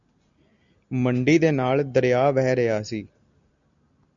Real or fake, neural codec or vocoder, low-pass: real; none; 7.2 kHz